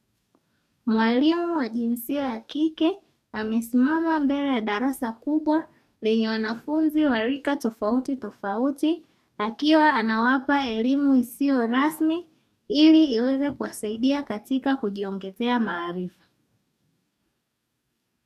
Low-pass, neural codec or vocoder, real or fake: 14.4 kHz; codec, 44.1 kHz, 2.6 kbps, DAC; fake